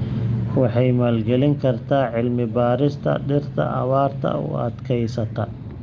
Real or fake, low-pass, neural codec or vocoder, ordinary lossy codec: real; 7.2 kHz; none; Opus, 32 kbps